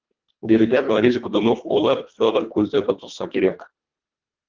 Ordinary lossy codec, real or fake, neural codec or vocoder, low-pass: Opus, 32 kbps; fake; codec, 24 kHz, 1.5 kbps, HILCodec; 7.2 kHz